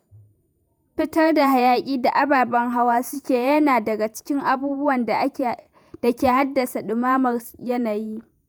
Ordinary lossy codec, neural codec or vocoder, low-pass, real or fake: none; vocoder, 48 kHz, 128 mel bands, Vocos; none; fake